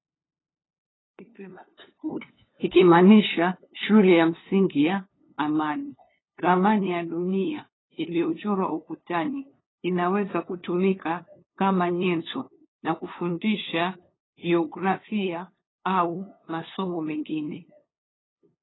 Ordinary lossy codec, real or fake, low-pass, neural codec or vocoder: AAC, 16 kbps; fake; 7.2 kHz; codec, 16 kHz, 2 kbps, FunCodec, trained on LibriTTS, 25 frames a second